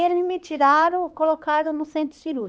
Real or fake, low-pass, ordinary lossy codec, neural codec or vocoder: fake; none; none; codec, 16 kHz, 2 kbps, X-Codec, HuBERT features, trained on LibriSpeech